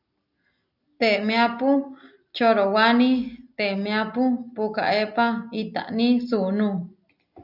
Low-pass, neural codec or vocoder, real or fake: 5.4 kHz; none; real